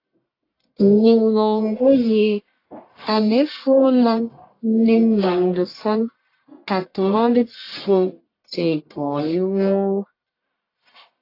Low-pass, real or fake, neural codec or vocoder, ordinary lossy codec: 5.4 kHz; fake; codec, 44.1 kHz, 1.7 kbps, Pupu-Codec; AAC, 32 kbps